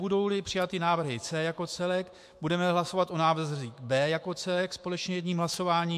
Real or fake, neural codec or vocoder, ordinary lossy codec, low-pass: fake; autoencoder, 48 kHz, 128 numbers a frame, DAC-VAE, trained on Japanese speech; MP3, 64 kbps; 14.4 kHz